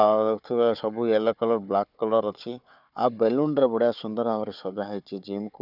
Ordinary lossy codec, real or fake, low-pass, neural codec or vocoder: none; fake; 5.4 kHz; codec, 16 kHz, 4 kbps, FunCodec, trained on Chinese and English, 50 frames a second